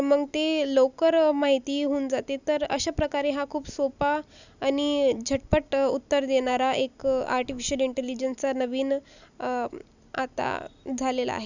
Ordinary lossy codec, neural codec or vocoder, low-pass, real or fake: none; none; 7.2 kHz; real